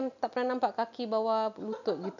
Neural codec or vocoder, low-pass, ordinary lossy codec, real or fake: none; 7.2 kHz; none; real